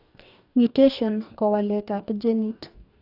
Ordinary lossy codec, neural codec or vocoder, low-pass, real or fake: AAC, 48 kbps; codec, 44.1 kHz, 2.6 kbps, DAC; 5.4 kHz; fake